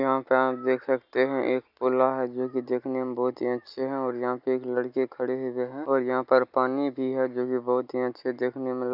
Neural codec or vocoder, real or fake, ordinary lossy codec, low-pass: none; real; none; 5.4 kHz